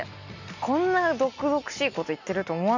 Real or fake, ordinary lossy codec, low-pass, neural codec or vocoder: real; none; 7.2 kHz; none